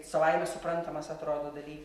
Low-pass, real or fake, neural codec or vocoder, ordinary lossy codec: 14.4 kHz; real; none; MP3, 64 kbps